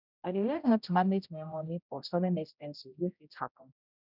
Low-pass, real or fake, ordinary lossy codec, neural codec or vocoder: 5.4 kHz; fake; none; codec, 16 kHz, 0.5 kbps, X-Codec, HuBERT features, trained on general audio